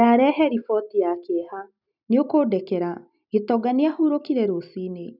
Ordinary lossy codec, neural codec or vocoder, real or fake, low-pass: none; none; real; 5.4 kHz